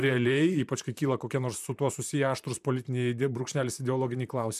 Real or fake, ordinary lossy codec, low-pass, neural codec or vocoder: fake; MP3, 96 kbps; 14.4 kHz; vocoder, 44.1 kHz, 128 mel bands, Pupu-Vocoder